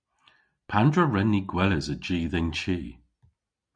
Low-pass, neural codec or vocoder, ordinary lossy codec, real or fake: 9.9 kHz; none; MP3, 96 kbps; real